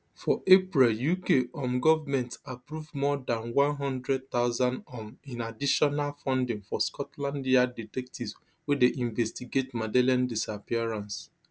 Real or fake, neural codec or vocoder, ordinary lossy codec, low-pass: real; none; none; none